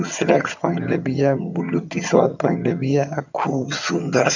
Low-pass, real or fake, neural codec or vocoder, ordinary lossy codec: 7.2 kHz; fake; vocoder, 22.05 kHz, 80 mel bands, HiFi-GAN; none